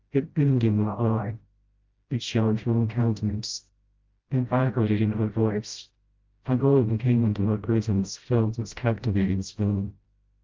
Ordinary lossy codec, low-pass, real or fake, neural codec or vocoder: Opus, 24 kbps; 7.2 kHz; fake; codec, 16 kHz, 0.5 kbps, FreqCodec, smaller model